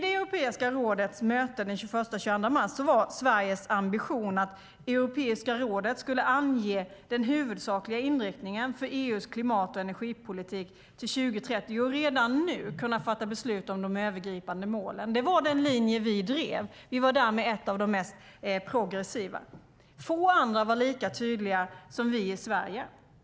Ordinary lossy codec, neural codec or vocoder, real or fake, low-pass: none; none; real; none